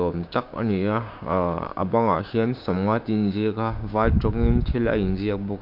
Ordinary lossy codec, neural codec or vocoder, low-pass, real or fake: MP3, 48 kbps; codec, 16 kHz, 6 kbps, DAC; 5.4 kHz; fake